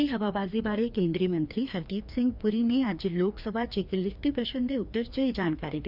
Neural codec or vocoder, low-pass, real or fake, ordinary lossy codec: codec, 16 kHz, 2 kbps, FreqCodec, larger model; 5.4 kHz; fake; none